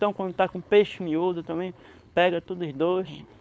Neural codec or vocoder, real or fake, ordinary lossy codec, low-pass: codec, 16 kHz, 4.8 kbps, FACodec; fake; none; none